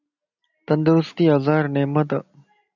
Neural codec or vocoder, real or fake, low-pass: none; real; 7.2 kHz